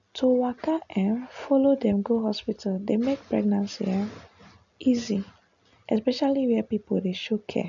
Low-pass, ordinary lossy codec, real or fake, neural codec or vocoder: 7.2 kHz; none; real; none